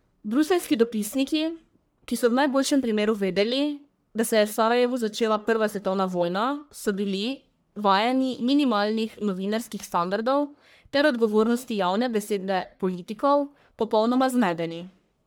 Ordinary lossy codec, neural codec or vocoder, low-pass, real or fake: none; codec, 44.1 kHz, 1.7 kbps, Pupu-Codec; none; fake